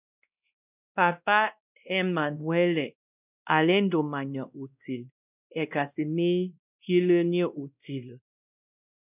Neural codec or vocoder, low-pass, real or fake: codec, 16 kHz, 1 kbps, X-Codec, WavLM features, trained on Multilingual LibriSpeech; 3.6 kHz; fake